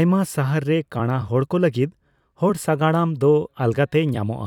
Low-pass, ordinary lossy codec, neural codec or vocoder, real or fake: 19.8 kHz; none; vocoder, 44.1 kHz, 128 mel bands every 256 samples, BigVGAN v2; fake